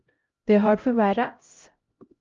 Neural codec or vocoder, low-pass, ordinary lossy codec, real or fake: codec, 16 kHz, 0.5 kbps, X-Codec, HuBERT features, trained on LibriSpeech; 7.2 kHz; Opus, 32 kbps; fake